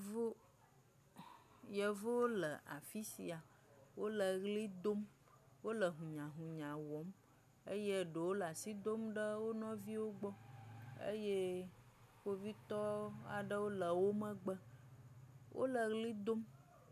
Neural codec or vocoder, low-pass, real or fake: none; 14.4 kHz; real